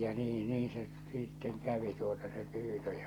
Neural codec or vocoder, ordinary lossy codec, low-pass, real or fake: none; Opus, 64 kbps; 19.8 kHz; real